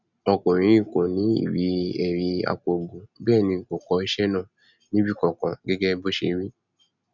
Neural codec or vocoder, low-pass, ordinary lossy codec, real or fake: none; 7.2 kHz; none; real